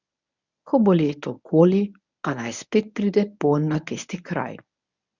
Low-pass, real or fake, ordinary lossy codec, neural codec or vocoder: 7.2 kHz; fake; none; codec, 24 kHz, 0.9 kbps, WavTokenizer, medium speech release version 1